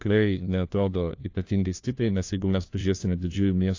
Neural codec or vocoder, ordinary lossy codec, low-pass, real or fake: codec, 16 kHz, 1 kbps, FunCodec, trained on LibriTTS, 50 frames a second; AAC, 48 kbps; 7.2 kHz; fake